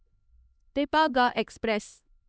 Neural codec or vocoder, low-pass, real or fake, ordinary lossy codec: codec, 16 kHz, 2 kbps, X-Codec, HuBERT features, trained on LibriSpeech; none; fake; none